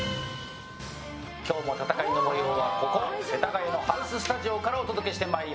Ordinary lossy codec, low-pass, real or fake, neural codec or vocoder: none; none; real; none